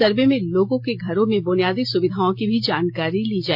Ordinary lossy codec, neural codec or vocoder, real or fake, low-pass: AAC, 48 kbps; none; real; 5.4 kHz